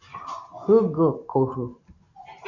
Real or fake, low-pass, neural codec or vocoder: real; 7.2 kHz; none